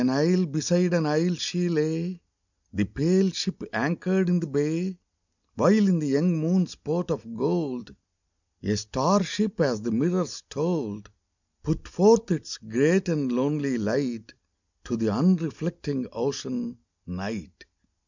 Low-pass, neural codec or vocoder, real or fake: 7.2 kHz; none; real